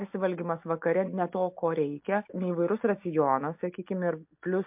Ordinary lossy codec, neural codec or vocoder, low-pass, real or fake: MP3, 32 kbps; none; 3.6 kHz; real